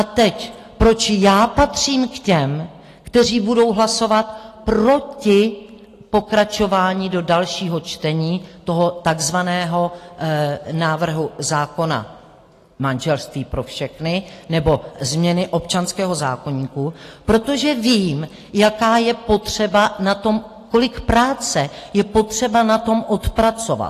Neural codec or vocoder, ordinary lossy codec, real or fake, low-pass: none; AAC, 48 kbps; real; 14.4 kHz